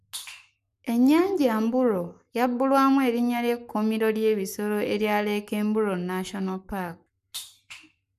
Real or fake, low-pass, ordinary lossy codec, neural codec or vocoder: fake; 14.4 kHz; MP3, 96 kbps; codec, 44.1 kHz, 7.8 kbps, Pupu-Codec